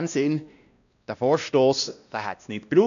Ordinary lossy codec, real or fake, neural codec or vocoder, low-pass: none; fake; codec, 16 kHz, 1 kbps, X-Codec, WavLM features, trained on Multilingual LibriSpeech; 7.2 kHz